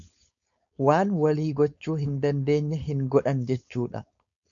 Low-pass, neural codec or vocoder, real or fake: 7.2 kHz; codec, 16 kHz, 4.8 kbps, FACodec; fake